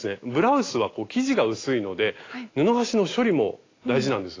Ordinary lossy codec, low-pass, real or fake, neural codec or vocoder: AAC, 32 kbps; 7.2 kHz; real; none